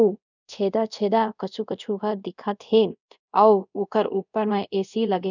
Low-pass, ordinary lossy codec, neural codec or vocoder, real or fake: 7.2 kHz; none; codec, 24 kHz, 0.5 kbps, DualCodec; fake